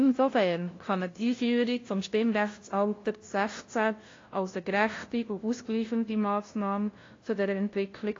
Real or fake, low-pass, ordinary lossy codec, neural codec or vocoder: fake; 7.2 kHz; AAC, 32 kbps; codec, 16 kHz, 0.5 kbps, FunCodec, trained on LibriTTS, 25 frames a second